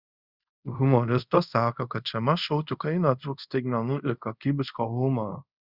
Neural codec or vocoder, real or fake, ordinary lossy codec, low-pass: codec, 24 kHz, 0.5 kbps, DualCodec; fake; Opus, 64 kbps; 5.4 kHz